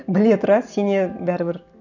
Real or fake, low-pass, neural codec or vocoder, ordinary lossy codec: real; 7.2 kHz; none; none